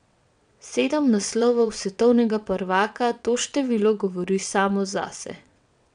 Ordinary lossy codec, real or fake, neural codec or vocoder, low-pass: none; fake; vocoder, 22.05 kHz, 80 mel bands, WaveNeXt; 9.9 kHz